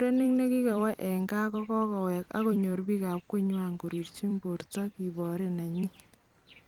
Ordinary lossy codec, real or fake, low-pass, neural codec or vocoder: Opus, 32 kbps; fake; 19.8 kHz; vocoder, 44.1 kHz, 128 mel bands every 256 samples, BigVGAN v2